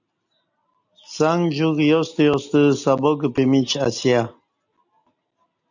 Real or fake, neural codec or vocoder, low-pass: real; none; 7.2 kHz